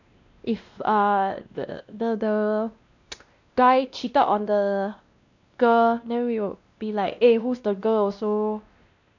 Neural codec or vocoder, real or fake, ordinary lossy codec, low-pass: codec, 16 kHz, 1 kbps, X-Codec, WavLM features, trained on Multilingual LibriSpeech; fake; none; 7.2 kHz